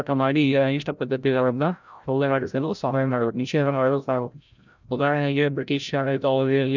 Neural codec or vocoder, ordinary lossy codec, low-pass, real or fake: codec, 16 kHz, 0.5 kbps, FreqCodec, larger model; none; 7.2 kHz; fake